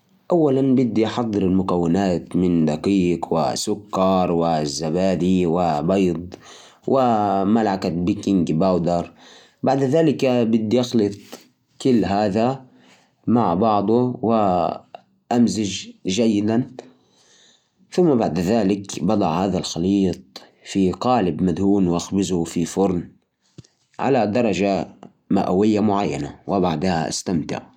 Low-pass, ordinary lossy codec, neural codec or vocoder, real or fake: 19.8 kHz; none; none; real